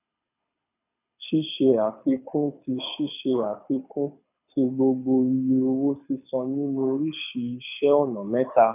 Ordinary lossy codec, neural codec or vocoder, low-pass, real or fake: none; codec, 24 kHz, 6 kbps, HILCodec; 3.6 kHz; fake